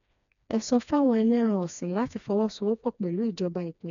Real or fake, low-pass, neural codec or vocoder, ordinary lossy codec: fake; 7.2 kHz; codec, 16 kHz, 2 kbps, FreqCodec, smaller model; none